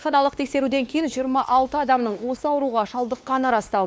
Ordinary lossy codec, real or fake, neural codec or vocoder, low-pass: none; fake; codec, 16 kHz, 2 kbps, X-Codec, WavLM features, trained on Multilingual LibriSpeech; none